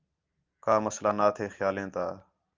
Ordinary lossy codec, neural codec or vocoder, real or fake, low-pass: Opus, 24 kbps; none; real; 7.2 kHz